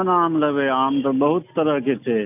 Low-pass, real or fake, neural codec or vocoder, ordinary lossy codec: 3.6 kHz; real; none; none